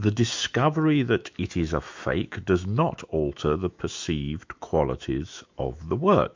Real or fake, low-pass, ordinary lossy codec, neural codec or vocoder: fake; 7.2 kHz; MP3, 64 kbps; autoencoder, 48 kHz, 128 numbers a frame, DAC-VAE, trained on Japanese speech